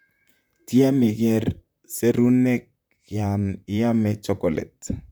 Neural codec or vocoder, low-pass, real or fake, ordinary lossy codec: vocoder, 44.1 kHz, 128 mel bands, Pupu-Vocoder; none; fake; none